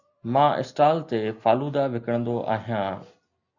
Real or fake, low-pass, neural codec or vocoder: real; 7.2 kHz; none